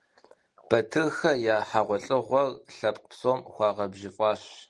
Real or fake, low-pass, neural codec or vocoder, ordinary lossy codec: real; 10.8 kHz; none; Opus, 24 kbps